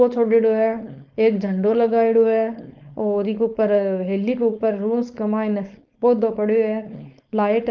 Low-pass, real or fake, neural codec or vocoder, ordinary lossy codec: 7.2 kHz; fake; codec, 16 kHz, 4.8 kbps, FACodec; Opus, 32 kbps